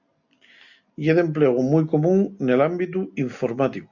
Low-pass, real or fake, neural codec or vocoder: 7.2 kHz; real; none